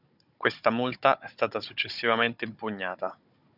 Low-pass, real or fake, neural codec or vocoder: 5.4 kHz; fake; codec, 16 kHz, 16 kbps, FunCodec, trained on Chinese and English, 50 frames a second